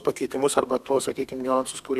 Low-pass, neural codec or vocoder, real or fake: 14.4 kHz; codec, 32 kHz, 1.9 kbps, SNAC; fake